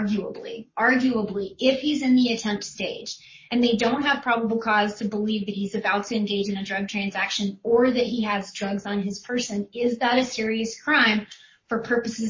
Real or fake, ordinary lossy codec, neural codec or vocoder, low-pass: fake; MP3, 32 kbps; codec, 44.1 kHz, 7.8 kbps, Pupu-Codec; 7.2 kHz